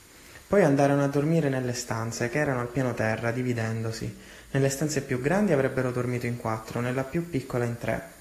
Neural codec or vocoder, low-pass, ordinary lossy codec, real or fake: none; 14.4 kHz; AAC, 48 kbps; real